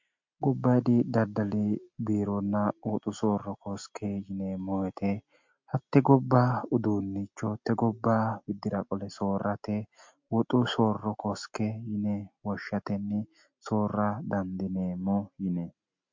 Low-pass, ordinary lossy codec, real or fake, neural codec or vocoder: 7.2 kHz; MP3, 48 kbps; real; none